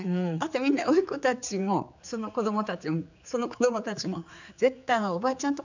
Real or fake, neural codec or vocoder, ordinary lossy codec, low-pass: fake; codec, 16 kHz, 4 kbps, X-Codec, HuBERT features, trained on general audio; none; 7.2 kHz